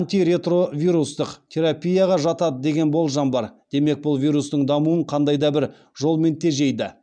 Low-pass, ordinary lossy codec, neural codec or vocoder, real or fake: 9.9 kHz; MP3, 96 kbps; none; real